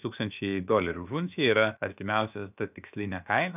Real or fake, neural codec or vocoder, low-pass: fake; codec, 16 kHz, about 1 kbps, DyCAST, with the encoder's durations; 3.6 kHz